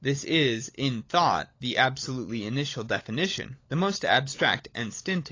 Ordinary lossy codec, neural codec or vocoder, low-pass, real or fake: AAC, 32 kbps; codec, 16 kHz, 16 kbps, FunCodec, trained on LibriTTS, 50 frames a second; 7.2 kHz; fake